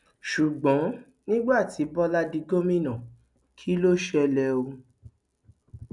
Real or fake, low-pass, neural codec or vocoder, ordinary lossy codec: real; 10.8 kHz; none; none